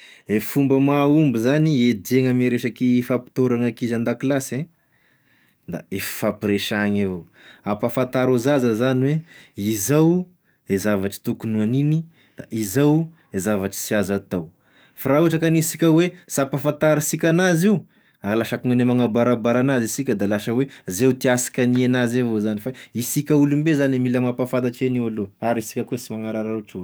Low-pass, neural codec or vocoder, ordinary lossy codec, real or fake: none; codec, 44.1 kHz, 7.8 kbps, DAC; none; fake